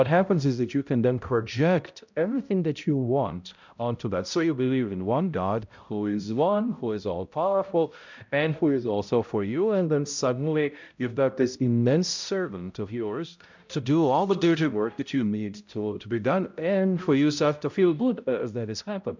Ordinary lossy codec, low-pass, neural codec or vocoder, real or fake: MP3, 64 kbps; 7.2 kHz; codec, 16 kHz, 0.5 kbps, X-Codec, HuBERT features, trained on balanced general audio; fake